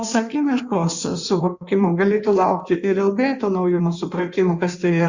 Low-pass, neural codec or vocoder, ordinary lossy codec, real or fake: 7.2 kHz; codec, 16 kHz in and 24 kHz out, 1.1 kbps, FireRedTTS-2 codec; Opus, 64 kbps; fake